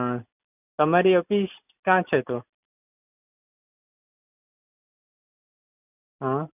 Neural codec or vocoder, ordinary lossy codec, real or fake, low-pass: none; none; real; 3.6 kHz